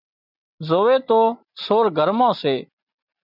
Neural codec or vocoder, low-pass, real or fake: none; 5.4 kHz; real